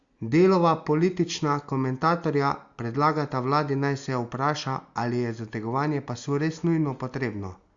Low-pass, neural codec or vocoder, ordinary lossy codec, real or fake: 7.2 kHz; none; Opus, 64 kbps; real